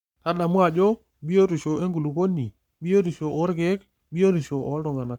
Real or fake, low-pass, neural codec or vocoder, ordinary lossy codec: fake; 19.8 kHz; codec, 44.1 kHz, 7.8 kbps, Pupu-Codec; none